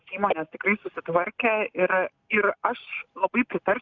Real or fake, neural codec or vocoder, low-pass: real; none; 7.2 kHz